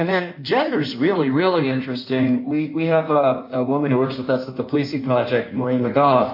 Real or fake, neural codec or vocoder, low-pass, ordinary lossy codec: fake; codec, 16 kHz in and 24 kHz out, 1.1 kbps, FireRedTTS-2 codec; 5.4 kHz; MP3, 32 kbps